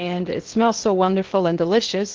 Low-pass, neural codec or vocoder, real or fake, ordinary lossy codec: 7.2 kHz; codec, 16 kHz in and 24 kHz out, 0.8 kbps, FocalCodec, streaming, 65536 codes; fake; Opus, 16 kbps